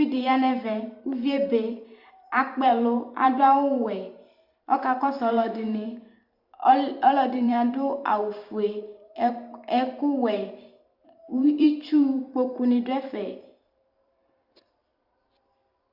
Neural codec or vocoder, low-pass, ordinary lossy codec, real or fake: vocoder, 44.1 kHz, 128 mel bands every 512 samples, BigVGAN v2; 5.4 kHz; Opus, 64 kbps; fake